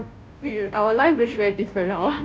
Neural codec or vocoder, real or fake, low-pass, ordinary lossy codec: codec, 16 kHz, 0.5 kbps, FunCodec, trained on Chinese and English, 25 frames a second; fake; none; none